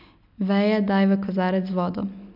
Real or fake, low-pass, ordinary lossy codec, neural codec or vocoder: real; 5.4 kHz; none; none